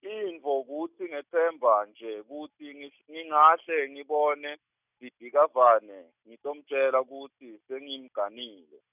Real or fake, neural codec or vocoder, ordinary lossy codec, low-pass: real; none; none; 3.6 kHz